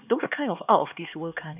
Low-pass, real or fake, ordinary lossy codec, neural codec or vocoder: 3.6 kHz; fake; none; codec, 16 kHz, 2 kbps, X-Codec, HuBERT features, trained on LibriSpeech